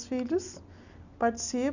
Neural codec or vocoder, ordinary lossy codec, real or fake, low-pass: none; none; real; 7.2 kHz